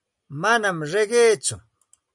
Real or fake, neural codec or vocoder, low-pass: real; none; 10.8 kHz